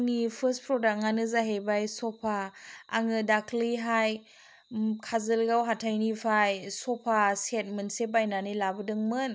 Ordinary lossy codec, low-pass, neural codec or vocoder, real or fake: none; none; none; real